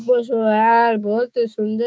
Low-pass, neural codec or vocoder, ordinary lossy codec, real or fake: none; codec, 16 kHz, 6 kbps, DAC; none; fake